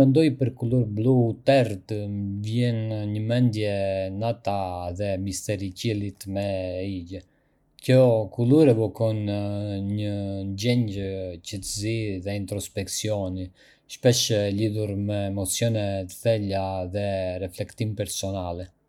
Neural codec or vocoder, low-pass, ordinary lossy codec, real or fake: none; 19.8 kHz; none; real